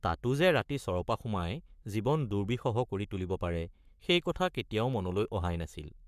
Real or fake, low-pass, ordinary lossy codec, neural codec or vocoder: fake; 14.4 kHz; MP3, 96 kbps; codec, 44.1 kHz, 7.8 kbps, Pupu-Codec